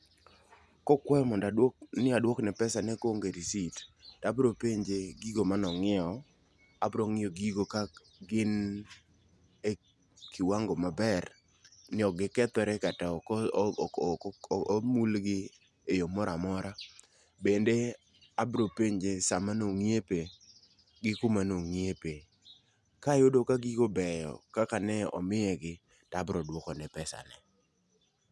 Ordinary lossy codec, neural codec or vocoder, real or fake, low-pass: none; none; real; none